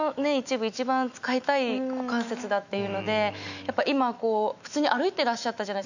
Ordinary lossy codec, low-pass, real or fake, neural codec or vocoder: none; 7.2 kHz; fake; autoencoder, 48 kHz, 128 numbers a frame, DAC-VAE, trained on Japanese speech